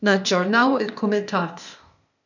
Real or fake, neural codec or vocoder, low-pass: fake; codec, 16 kHz, 0.8 kbps, ZipCodec; 7.2 kHz